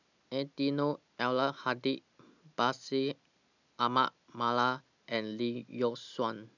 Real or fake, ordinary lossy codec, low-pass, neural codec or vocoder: real; none; 7.2 kHz; none